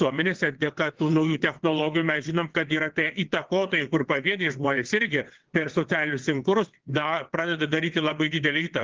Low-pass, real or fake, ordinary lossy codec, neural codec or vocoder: 7.2 kHz; fake; Opus, 16 kbps; vocoder, 22.05 kHz, 80 mel bands, WaveNeXt